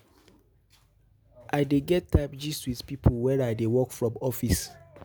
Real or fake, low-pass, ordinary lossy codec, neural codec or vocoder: real; none; none; none